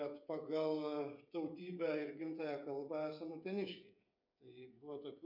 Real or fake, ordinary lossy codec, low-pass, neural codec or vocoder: fake; MP3, 48 kbps; 5.4 kHz; codec, 16 kHz, 8 kbps, FreqCodec, smaller model